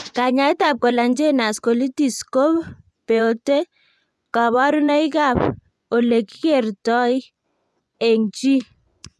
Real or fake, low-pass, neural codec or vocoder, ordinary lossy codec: fake; none; vocoder, 24 kHz, 100 mel bands, Vocos; none